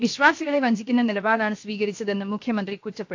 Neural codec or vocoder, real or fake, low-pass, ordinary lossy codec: codec, 16 kHz, 0.7 kbps, FocalCodec; fake; 7.2 kHz; AAC, 48 kbps